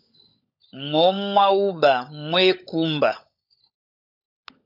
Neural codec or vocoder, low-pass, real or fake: codec, 16 kHz, 16 kbps, FunCodec, trained on LibriTTS, 50 frames a second; 5.4 kHz; fake